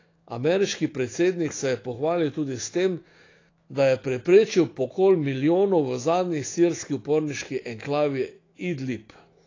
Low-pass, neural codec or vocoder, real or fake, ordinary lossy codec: 7.2 kHz; autoencoder, 48 kHz, 128 numbers a frame, DAC-VAE, trained on Japanese speech; fake; AAC, 32 kbps